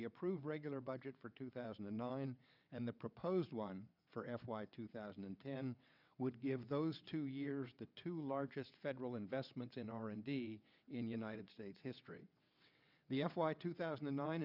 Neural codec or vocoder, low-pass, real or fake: vocoder, 22.05 kHz, 80 mel bands, WaveNeXt; 5.4 kHz; fake